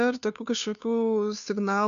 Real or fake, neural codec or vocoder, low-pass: fake; codec, 16 kHz, 2 kbps, FunCodec, trained on Chinese and English, 25 frames a second; 7.2 kHz